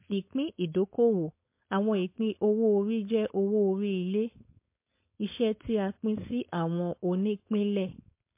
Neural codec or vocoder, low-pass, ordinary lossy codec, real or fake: codec, 16 kHz, 4.8 kbps, FACodec; 3.6 kHz; MP3, 24 kbps; fake